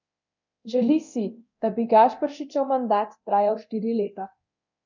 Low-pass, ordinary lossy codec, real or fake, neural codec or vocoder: 7.2 kHz; none; fake; codec, 24 kHz, 0.9 kbps, DualCodec